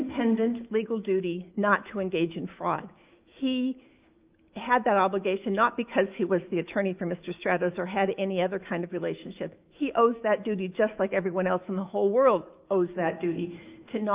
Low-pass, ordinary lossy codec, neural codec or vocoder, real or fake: 3.6 kHz; Opus, 24 kbps; autoencoder, 48 kHz, 128 numbers a frame, DAC-VAE, trained on Japanese speech; fake